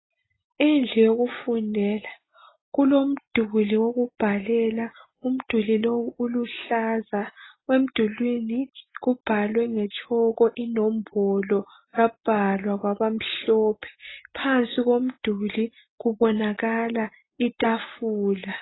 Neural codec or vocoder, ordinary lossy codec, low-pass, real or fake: none; AAC, 16 kbps; 7.2 kHz; real